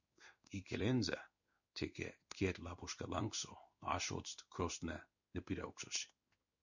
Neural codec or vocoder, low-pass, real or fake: codec, 16 kHz in and 24 kHz out, 1 kbps, XY-Tokenizer; 7.2 kHz; fake